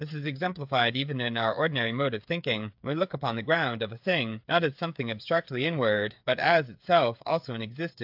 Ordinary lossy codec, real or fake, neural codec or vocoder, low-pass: AAC, 48 kbps; fake; codec, 16 kHz, 8 kbps, FreqCodec, smaller model; 5.4 kHz